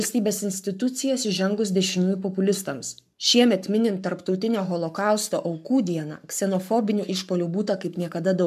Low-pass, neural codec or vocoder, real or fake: 14.4 kHz; codec, 44.1 kHz, 7.8 kbps, Pupu-Codec; fake